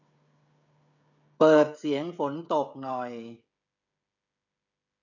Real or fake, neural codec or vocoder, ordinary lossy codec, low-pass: fake; codec, 16 kHz, 16 kbps, FreqCodec, smaller model; none; 7.2 kHz